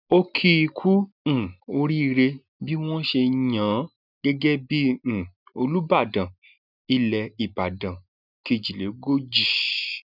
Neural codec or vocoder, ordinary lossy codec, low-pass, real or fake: none; none; 5.4 kHz; real